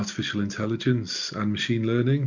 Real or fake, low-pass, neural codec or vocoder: real; 7.2 kHz; none